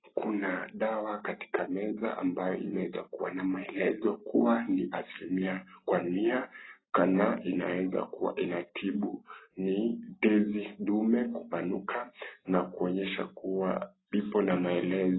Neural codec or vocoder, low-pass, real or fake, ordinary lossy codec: none; 7.2 kHz; real; AAC, 16 kbps